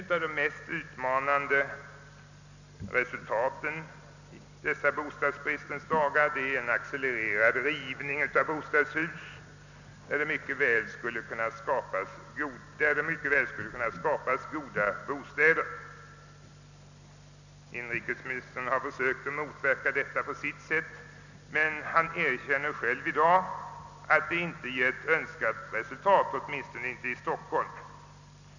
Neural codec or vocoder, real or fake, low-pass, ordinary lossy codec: none; real; 7.2 kHz; none